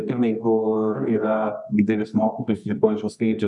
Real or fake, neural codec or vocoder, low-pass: fake; codec, 24 kHz, 0.9 kbps, WavTokenizer, medium music audio release; 10.8 kHz